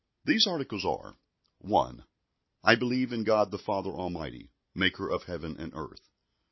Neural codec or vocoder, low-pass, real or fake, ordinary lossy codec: none; 7.2 kHz; real; MP3, 24 kbps